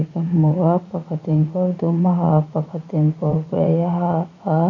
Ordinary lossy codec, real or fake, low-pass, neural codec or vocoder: none; fake; 7.2 kHz; vocoder, 44.1 kHz, 80 mel bands, Vocos